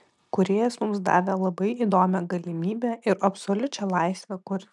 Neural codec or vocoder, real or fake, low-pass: none; real; 10.8 kHz